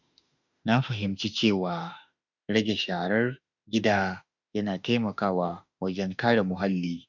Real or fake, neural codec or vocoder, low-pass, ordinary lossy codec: fake; autoencoder, 48 kHz, 32 numbers a frame, DAC-VAE, trained on Japanese speech; 7.2 kHz; none